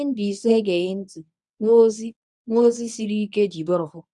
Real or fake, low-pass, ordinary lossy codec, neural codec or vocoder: fake; 10.8 kHz; none; codec, 24 kHz, 0.9 kbps, WavTokenizer, medium speech release version 1